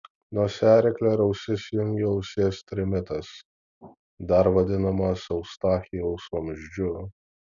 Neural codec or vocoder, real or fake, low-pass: none; real; 7.2 kHz